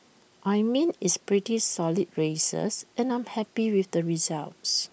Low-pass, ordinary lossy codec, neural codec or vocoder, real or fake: none; none; none; real